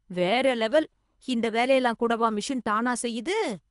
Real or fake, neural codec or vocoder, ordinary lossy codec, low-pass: fake; codec, 24 kHz, 3 kbps, HILCodec; MP3, 96 kbps; 10.8 kHz